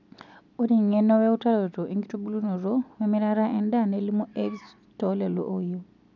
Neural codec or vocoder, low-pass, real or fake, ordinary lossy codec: none; 7.2 kHz; real; none